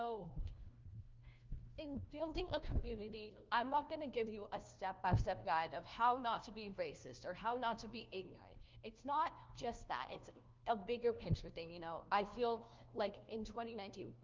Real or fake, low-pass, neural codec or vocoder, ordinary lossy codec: fake; 7.2 kHz; codec, 16 kHz, 1 kbps, FunCodec, trained on LibriTTS, 50 frames a second; Opus, 24 kbps